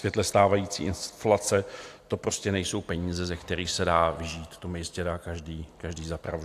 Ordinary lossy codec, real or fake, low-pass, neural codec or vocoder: AAC, 64 kbps; real; 14.4 kHz; none